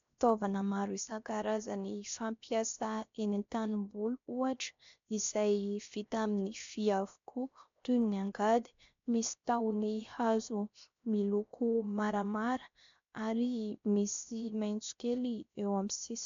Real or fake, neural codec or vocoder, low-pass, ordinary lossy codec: fake; codec, 16 kHz, 0.7 kbps, FocalCodec; 7.2 kHz; AAC, 48 kbps